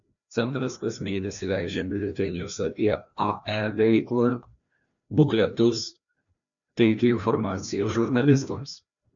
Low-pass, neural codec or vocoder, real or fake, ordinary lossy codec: 7.2 kHz; codec, 16 kHz, 1 kbps, FreqCodec, larger model; fake; MP3, 64 kbps